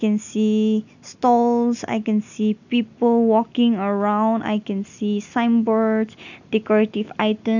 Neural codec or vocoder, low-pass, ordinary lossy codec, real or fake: none; 7.2 kHz; none; real